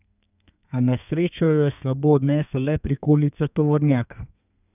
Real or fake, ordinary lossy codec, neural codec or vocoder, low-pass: fake; none; codec, 32 kHz, 1.9 kbps, SNAC; 3.6 kHz